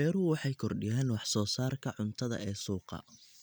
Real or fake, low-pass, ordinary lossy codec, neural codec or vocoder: real; none; none; none